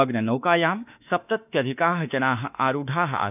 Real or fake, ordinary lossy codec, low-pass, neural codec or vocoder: fake; none; 3.6 kHz; autoencoder, 48 kHz, 32 numbers a frame, DAC-VAE, trained on Japanese speech